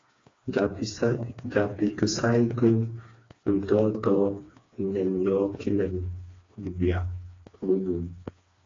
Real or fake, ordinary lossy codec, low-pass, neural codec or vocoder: fake; AAC, 32 kbps; 7.2 kHz; codec, 16 kHz, 2 kbps, FreqCodec, smaller model